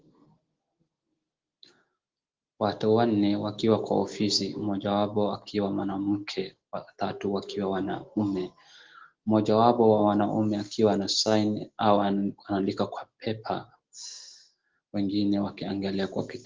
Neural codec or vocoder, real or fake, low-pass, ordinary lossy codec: none; real; 7.2 kHz; Opus, 16 kbps